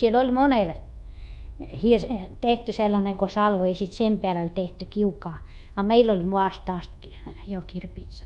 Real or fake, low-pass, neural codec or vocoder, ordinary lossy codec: fake; 10.8 kHz; codec, 24 kHz, 1.2 kbps, DualCodec; none